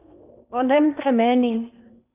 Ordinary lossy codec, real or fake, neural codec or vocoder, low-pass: AAC, 32 kbps; fake; codec, 16 kHz in and 24 kHz out, 0.8 kbps, FocalCodec, streaming, 65536 codes; 3.6 kHz